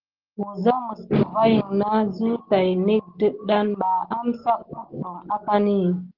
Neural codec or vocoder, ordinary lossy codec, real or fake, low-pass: none; Opus, 16 kbps; real; 5.4 kHz